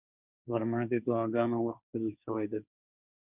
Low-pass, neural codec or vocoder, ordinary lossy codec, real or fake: 3.6 kHz; codec, 16 kHz, 1.1 kbps, Voila-Tokenizer; Opus, 64 kbps; fake